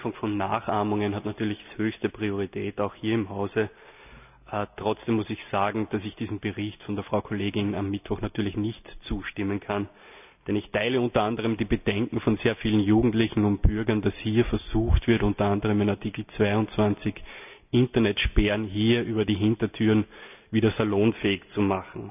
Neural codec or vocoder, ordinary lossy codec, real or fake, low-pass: none; none; real; 3.6 kHz